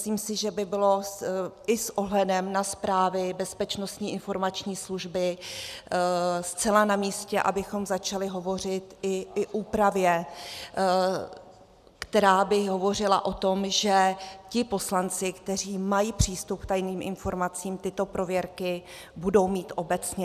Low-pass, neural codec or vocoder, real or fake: 14.4 kHz; none; real